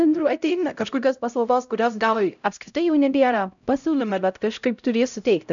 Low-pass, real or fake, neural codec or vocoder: 7.2 kHz; fake; codec, 16 kHz, 0.5 kbps, X-Codec, HuBERT features, trained on LibriSpeech